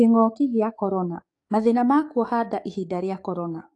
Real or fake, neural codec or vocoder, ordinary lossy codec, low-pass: fake; codec, 44.1 kHz, 7.8 kbps, DAC; AAC, 48 kbps; 10.8 kHz